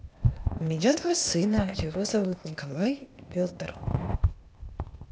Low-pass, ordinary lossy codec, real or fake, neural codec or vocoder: none; none; fake; codec, 16 kHz, 0.8 kbps, ZipCodec